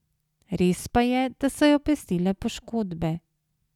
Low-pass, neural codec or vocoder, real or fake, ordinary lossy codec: 19.8 kHz; none; real; none